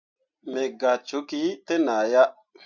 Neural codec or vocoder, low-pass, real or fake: none; 7.2 kHz; real